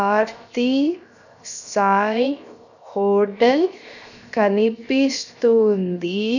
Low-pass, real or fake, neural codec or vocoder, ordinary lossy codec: 7.2 kHz; fake; codec, 16 kHz, 0.7 kbps, FocalCodec; none